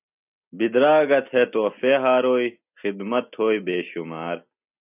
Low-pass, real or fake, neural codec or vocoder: 3.6 kHz; real; none